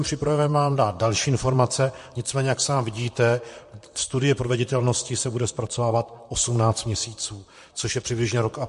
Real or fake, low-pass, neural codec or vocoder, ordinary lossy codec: fake; 14.4 kHz; vocoder, 44.1 kHz, 128 mel bands, Pupu-Vocoder; MP3, 48 kbps